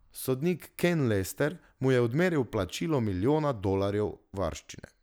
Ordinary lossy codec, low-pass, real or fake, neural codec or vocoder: none; none; real; none